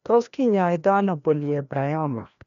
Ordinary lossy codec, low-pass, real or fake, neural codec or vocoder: none; 7.2 kHz; fake; codec, 16 kHz, 1 kbps, FreqCodec, larger model